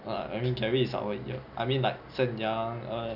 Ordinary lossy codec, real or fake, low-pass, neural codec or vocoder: none; real; 5.4 kHz; none